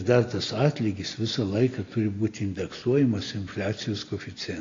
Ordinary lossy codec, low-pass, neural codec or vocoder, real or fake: AAC, 32 kbps; 7.2 kHz; none; real